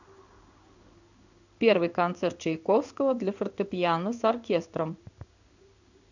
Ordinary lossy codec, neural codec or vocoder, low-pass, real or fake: none; codec, 16 kHz in and 24 kHz out, 1 kbps, XY-Tokenizer; 7.2 kHz; fake